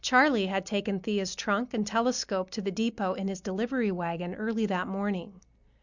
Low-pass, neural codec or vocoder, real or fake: 7.2 kHz; none; real